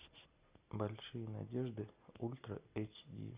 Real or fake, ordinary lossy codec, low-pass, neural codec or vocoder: real; Opus, 64 kbps; 3.6 kHz; none